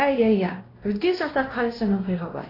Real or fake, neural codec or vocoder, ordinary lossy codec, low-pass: fake; codec, 16 kHz, 1 kbps, X-Codec, WavLM features, trained on Multilingual LibriSpeech; AAC, 24 kbps; 5.4 kHz